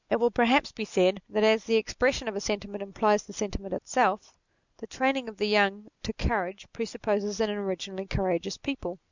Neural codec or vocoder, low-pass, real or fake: none; 7.2 kHz; real